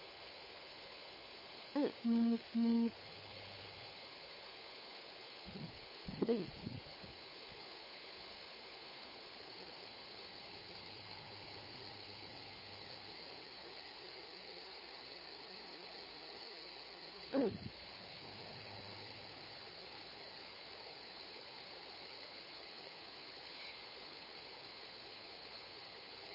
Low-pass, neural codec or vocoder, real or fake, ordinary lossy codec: 5.4 kHz; codec, 16 kHz, 4 kbps, FunCodec, trained on LibriTTS, 50 frames a second; fake; MP3, 24 kbps